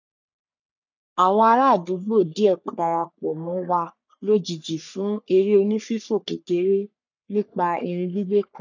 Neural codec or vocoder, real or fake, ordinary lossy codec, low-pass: codec, 44.1 kHz, 3.4 kbps, Pupu-Codec; fake; none; 7.2 kHz